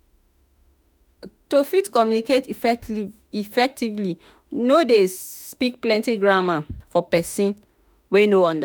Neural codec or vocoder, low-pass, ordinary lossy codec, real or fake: autoencoder, 48 kHz, 32 numbers a frame, DAC-VAE, trained on Japanese speech; none; none; fake